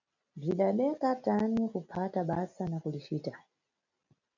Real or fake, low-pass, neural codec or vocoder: real; 7.2 kHz; none